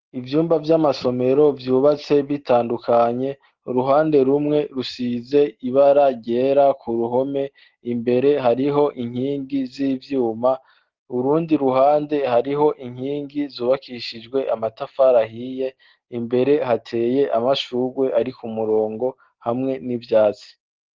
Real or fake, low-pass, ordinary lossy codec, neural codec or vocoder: real; 7.2 kHz; Opus, 16 kbps; none